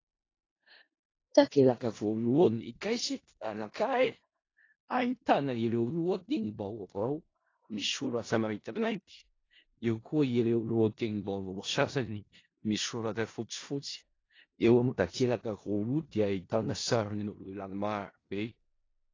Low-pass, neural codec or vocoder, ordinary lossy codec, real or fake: 7.2 kHz; codec, 16 kHz in and 24 kHz out, 0.4 kbps, LongCat-Audio-Codec, four codebook decoder; AAC, 32 kbps; fake